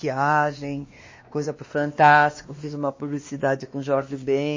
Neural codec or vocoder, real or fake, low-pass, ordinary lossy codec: codec, 16 kHz, 2 kbps, X-Codec, WavLM features, trained on Multilingual LibriSpeech; fake; 7.2 kHz; MP3, 32 kbps